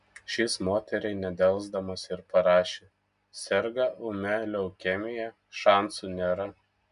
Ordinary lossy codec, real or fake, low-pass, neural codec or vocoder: MP3, 96 kbps; real; 10.8 kHz; none